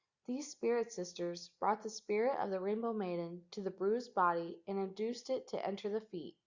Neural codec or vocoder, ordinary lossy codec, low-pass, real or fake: none; Opus, 64 kbps; 7.2 kHz; real